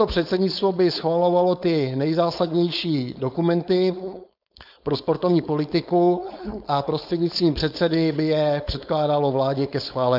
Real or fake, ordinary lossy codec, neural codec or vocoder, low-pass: fake; AAC, 48 kbps; codec, 16 kHz, 4.8 kbps, FACodec; 5.4 kHz